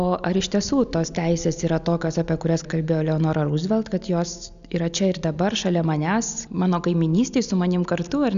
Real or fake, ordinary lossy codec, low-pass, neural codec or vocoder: real; AAC, 96 kbps; 7.2 kHz; none